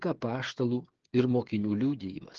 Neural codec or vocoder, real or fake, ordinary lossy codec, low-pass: codec, 16 kHz, 8 kbps, FreqCodec, smaller model; fake; Opus, 16 kbps; 7.2 kHz